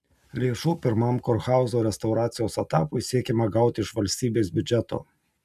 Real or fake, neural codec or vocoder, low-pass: real; none; 14.4 kHz